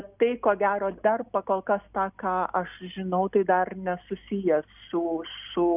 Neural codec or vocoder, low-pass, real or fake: none; 3.6 kHz; real